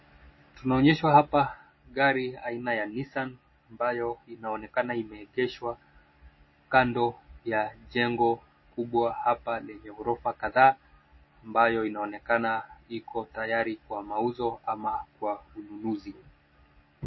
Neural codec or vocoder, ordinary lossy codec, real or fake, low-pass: none; MP3, 24 kbps; real; 7.2 kHz